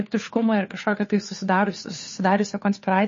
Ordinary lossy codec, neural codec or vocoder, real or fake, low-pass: MP3, 32 kbps; codec, 16 kHz, 2 kbps, FunCodec, trained on Chinese and English, 25 frames a second; fake; 7.2 kHz